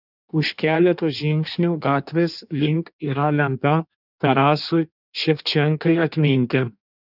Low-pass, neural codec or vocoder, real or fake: 5.4 kHz; codec, 16 kHz in and 24 kHz out, 1.1 kbps, FireRedTTS-2 codec; fake